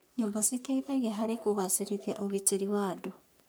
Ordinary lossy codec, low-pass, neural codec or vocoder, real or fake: none; none; codec, 44.1 kHz, 3.4 kbps, Pupu-Codec; fake